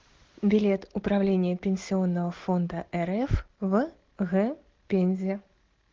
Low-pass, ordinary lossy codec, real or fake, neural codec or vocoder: 7.2 kHz; Opus, 16 kbps; real; none